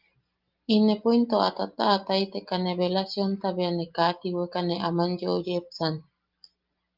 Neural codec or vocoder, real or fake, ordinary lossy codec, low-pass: none; real; Opus, 24 kbps; 5.4 kHz